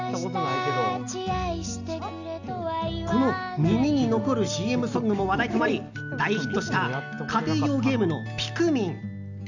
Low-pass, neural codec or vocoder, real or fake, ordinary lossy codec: 7.2 kHz; none; real; none